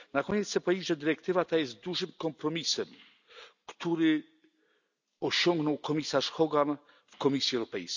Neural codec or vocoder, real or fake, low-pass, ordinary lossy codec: none; real; 7.2 kHz; none